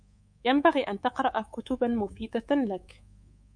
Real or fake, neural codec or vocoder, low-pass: fake; codec, 24 kHz, 3.1 kbps, DualCodec; 9.9 kHz